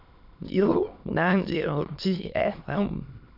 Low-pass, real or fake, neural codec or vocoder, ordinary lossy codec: 5.4 kHz; fake; autoencoder, 22.05 kHz, a latent of 192 numbers a frame, VITS, trained on many speakers; none